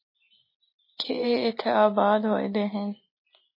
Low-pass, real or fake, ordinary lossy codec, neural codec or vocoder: 5.4 kHz; real; MP3, 24 kbps; none